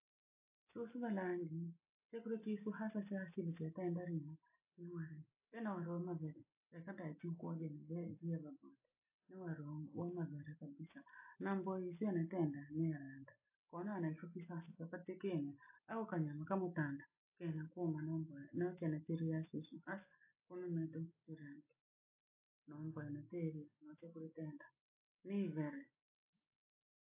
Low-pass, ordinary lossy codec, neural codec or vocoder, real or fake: 3.6 kHz; AAC, 32 kbps; none; real